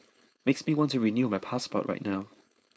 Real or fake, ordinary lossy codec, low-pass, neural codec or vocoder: fake; none; none; codec, 16 kHz, 4.8 kbps, FACodec